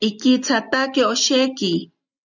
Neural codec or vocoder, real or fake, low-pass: none; real; 7.2 kHz